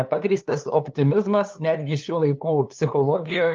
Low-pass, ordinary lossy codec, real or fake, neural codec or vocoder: 7.2 kHz; Opus, 24 kbps; fake; codec, 16 kHz, 2 kbps, FunCodec, trained on LibriTTS, 25 frames a second